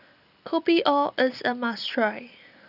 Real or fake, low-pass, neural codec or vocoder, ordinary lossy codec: real; 5.4 kHz; none; none